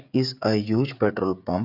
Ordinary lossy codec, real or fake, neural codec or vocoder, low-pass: none; fake; codec, 16 kHz, 16 kbps, FreqCodec, smaller model; 5.4 kHz